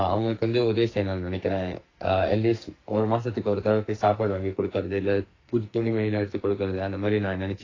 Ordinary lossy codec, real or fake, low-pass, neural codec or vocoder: AAC, 32 kbps; fake; 7.2 kHz; codec, 44.1 kHz, 2.6 kbps, SNAC